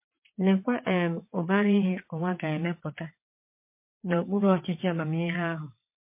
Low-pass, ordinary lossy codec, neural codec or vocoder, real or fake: 3.6 kHz; MP3, 24 kbps; vocoder, 22.05 kHz, 80 mel bands, WaveNeXt; fake